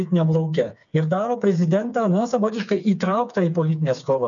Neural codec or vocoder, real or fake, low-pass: codec, 16 kHz, 4 kbps, FreqCodec, smaller model; fake; 7.2 kHz